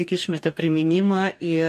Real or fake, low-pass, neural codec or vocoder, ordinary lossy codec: fake; 14.4 kHz; codec, 44.1 kHz, 2.6 kbps, DAC; AAC, 96 kbps